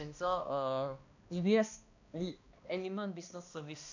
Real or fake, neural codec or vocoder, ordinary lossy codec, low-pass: fake; codec, 16 kHz, 1 kbps, X-Codec, HuBERT features, trained on balanced general audio; none; 7.2 kHz